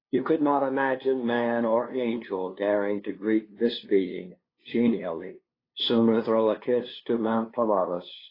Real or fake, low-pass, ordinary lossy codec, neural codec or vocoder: fake; 5.4 kHz; AAC, 24 kbps; codec, 16 kHz, 2 kbps, FunCodec, trained on LibriTTS, 25 frames a second